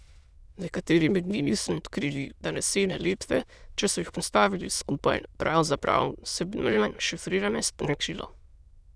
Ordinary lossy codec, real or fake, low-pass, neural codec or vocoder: none; fake; none; autoencoder, 22.05 kHz, a latent of 192 numbers a frame, VITS, trained on many speakers